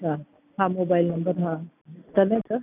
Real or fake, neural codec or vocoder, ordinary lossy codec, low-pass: real; none; none; 3.6 kHz